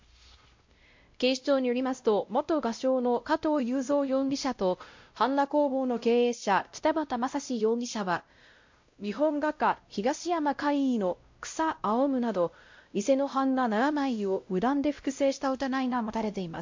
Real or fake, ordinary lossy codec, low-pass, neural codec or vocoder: fake; MP3, 48 kbps; 7.2 kHz; codec, 16 kHz, 0.5 kbps, X-Codec, WavLM features, trained on Multilingual LibriSpeech